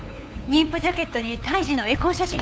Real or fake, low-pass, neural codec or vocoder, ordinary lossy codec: fake; none; codec, 16 kHz, 8 kbps, FunCodec, trained on LibriTTS, 25 frames a second; none